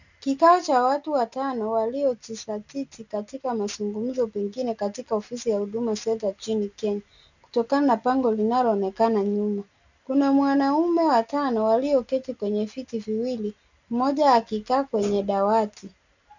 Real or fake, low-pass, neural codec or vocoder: real; 7.2 kHz; none